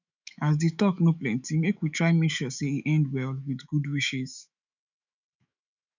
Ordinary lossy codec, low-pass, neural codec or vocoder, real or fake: none; 7.2 kHz; autoencoder, 48 kHz, 128 numbers a frame, DAC-VAE, trained on Japanese speech; fake